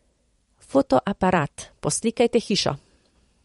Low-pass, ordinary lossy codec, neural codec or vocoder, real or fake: 19.8 kHz; MP3, 48 kbps; vocoder, 44.1 kHz, 128 mel bands every 512 samples, BigVGAN v2; fake